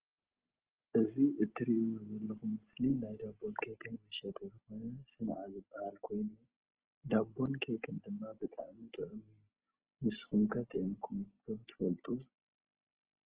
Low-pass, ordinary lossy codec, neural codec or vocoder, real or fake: 3.6 kHz; Opus, 16 kbps; none; real